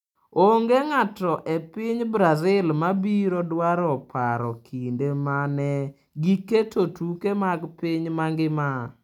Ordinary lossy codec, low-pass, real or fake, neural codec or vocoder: none; 19.8 kHz; real; none